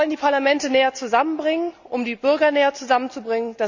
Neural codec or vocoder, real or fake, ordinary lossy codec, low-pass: none; real; none; 7.2 kHz